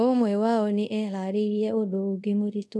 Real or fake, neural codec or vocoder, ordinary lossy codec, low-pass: fake; codec, 24 kHz, 0.5 kbps, DualCodec; none; none